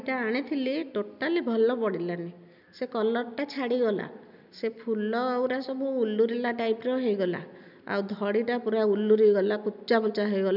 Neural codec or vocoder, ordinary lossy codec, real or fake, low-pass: none; none; real; 5.4 kHz